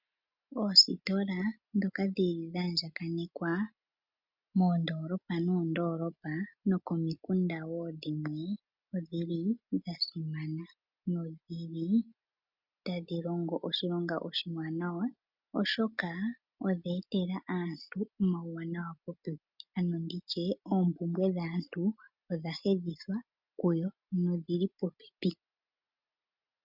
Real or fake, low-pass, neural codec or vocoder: real; 5.4 kHz; none